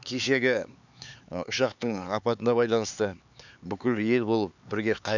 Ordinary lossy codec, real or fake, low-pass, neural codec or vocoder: none; fake; 7.2 kHz; codec, 16 kHz, 4 kbps, X-Codec, HuBERT features, trained on LibriSpeech